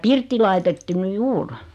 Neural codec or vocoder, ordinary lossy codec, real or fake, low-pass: none; none; real; 14.4 kHz